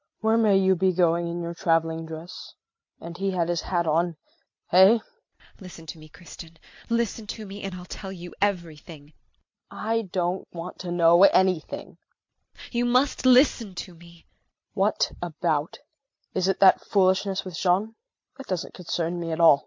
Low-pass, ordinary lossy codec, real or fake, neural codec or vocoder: 7.2 kHz; MP3, 48 kbps; real; none